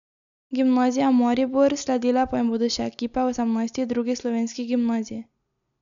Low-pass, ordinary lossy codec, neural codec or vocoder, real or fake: 7.2 kHz; none; none; real